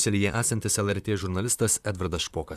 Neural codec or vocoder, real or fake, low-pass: vocoder, 44.1 kHz, 128 mel bands, Pupu-Vocoder; fake; 14.4 kHz